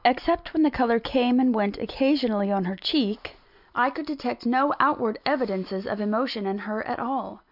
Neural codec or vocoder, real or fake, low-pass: none; real; 5.4 kHz